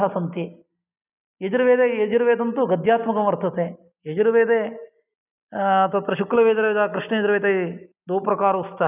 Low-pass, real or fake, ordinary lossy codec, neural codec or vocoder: 3.6 kHz; real; none; none